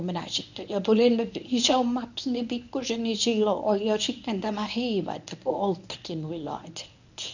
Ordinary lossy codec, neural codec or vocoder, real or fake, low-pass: none; codec, 24 kHz, 0.9 kbps, WavTokenizer, medium speech release version 1; fake; 7.2 kHz